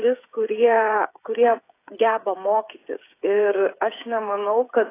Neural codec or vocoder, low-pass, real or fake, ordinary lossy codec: codec, 16 kHz, 8 kbps, FreqCodec, smaller model; 3.6 kHz; fake; AAC, 24 kbps